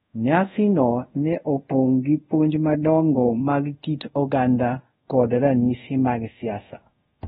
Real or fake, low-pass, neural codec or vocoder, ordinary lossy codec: fake; 10.8 kHz; codec, 24 kHz, 0.5 kbps, DualCodec; AAC, 16 kbps